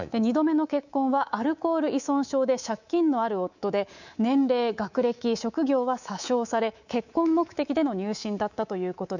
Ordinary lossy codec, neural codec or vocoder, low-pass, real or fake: none; codec, 24 kHz, 3.1 kbps, DualCodec; 7.2 kHz; fake